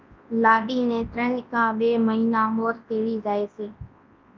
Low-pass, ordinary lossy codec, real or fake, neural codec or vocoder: 7.2 kHz; Opus, 24 kbps; fake; codec, 24 kHz, 0.9 kbps, WavTokenizer, large speech release